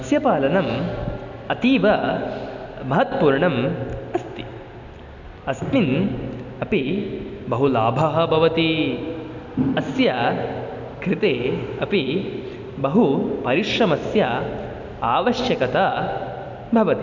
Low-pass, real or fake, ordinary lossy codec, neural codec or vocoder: 7.2 kHz; real; none; none